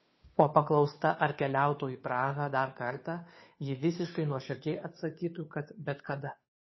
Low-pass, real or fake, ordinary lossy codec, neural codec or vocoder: 7.2 kHz; fake; MP3, 24 kbps; codec, 16 kHz, 2 kbps, FunCodec, trained on Chinese and English, 25 frames a second